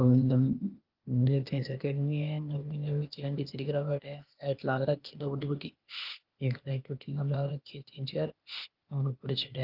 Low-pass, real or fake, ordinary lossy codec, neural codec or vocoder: 5.4 kHz; fake; Opus, 32 kbps; codec, 16 kHz, 0.8 kbps, ZipCodec